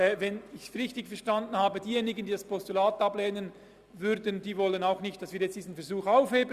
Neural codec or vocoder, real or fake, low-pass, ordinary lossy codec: none; real; 14.4 kHz; Opus, 64 kbps